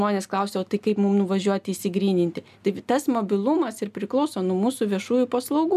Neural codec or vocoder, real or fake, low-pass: none; real; 14.4 kHz